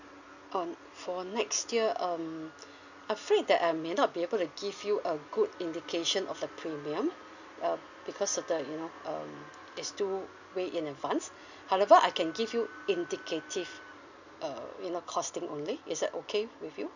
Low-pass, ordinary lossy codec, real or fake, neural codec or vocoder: 7.2 kHz; none; real; none